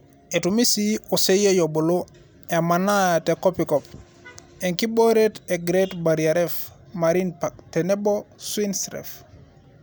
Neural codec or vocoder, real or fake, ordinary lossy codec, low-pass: none; real; none; none